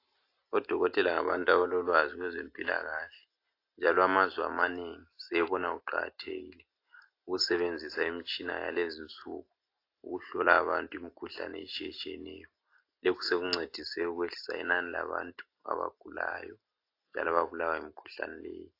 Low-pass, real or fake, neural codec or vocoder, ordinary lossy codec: 5.4 kHz; real; none; AAC, 32 kbps